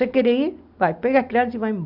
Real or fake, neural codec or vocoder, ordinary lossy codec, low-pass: real; none; AAC, 48 kbps; 5.4 kHz